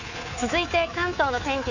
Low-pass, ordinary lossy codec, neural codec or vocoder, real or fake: 7.2 kHz; none; codec, 24 kHz, 3.1 kbps, DualCodec; fake